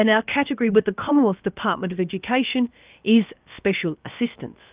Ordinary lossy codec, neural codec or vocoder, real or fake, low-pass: Opus, 24 kbps; codec, 16 kHz, about 1 kbps, DyCAST, with the encoder's durations; fake; 3.6 kHz